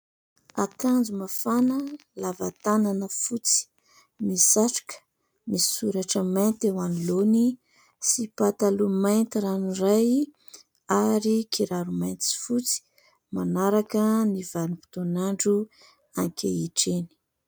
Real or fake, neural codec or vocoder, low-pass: real; none; 19.8 kHz